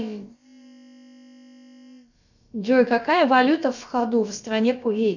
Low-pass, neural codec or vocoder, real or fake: 7.2 kHz; codec, 16 kHz, about 1 kbps, DyCAST, with the encoder's durations; fake